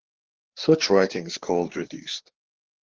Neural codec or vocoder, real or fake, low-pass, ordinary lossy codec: codec, 16 kHz, 4 kbps, FreqCodec, larger model; fake; 7.2 kHz; Opus, 16 kbps